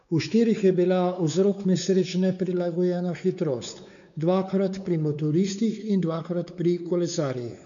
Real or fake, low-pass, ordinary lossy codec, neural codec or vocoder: fake; 7.2 kHz; none; codec, 16 kHz, 4 kbps, X-Codec, WavLM features, trained on Multilingual LibriSpeech